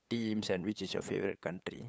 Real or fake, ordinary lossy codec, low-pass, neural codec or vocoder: fake; none; none; codec, 16 kHz, 8 kbps, FunCodec, trained on LibriTTS, 25 frames a second